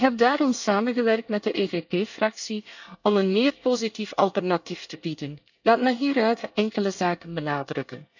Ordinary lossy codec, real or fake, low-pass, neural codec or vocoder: none; fake; 7.2 kHz; codec, 24 kHz, 1 kbps, SNAC